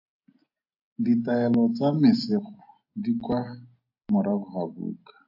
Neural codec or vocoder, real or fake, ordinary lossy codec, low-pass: none; real; AAC, 48 kbps; 5.4 kHz